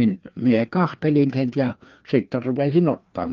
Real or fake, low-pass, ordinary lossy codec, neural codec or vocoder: fake; 7.2 kHz; Opus, 32 kbps; codec, 16 kHz, 2 kbps, FreqCodec, larger model